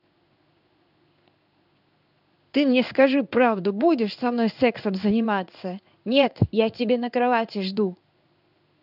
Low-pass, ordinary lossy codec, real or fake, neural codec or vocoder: 5.4 kHz; none; fake; codec, 16 kHz in and 24 kHz out, 1 kbps, XY-Tokenizer